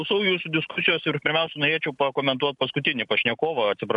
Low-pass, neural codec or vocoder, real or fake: 10.8 kHz; none; real